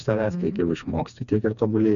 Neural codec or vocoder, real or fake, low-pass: codec, 16 kHz, 2 kbps, FreqCodec, smaller model; fake; 7.2 kHz